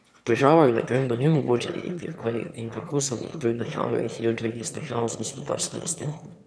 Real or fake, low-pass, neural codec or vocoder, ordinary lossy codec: fake; none; autoencoder, 22.05 kHz, a latent of 192 numbers a frame, VITS, trained on one speaker; none